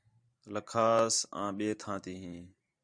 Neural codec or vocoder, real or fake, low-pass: vocoder, 44.1 kHz, 128 mel bands every 512 samples, BigVGAN v2; fake; 9.9 kHz